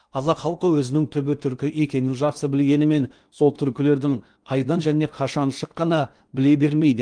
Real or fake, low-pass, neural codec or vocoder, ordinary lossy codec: fake; 9.9 kHz; codec, 16 kHz in and 24 kHz out, 0.8 kbps, FocalCodec, streaming, 65536 codes; Opus, 32 kbps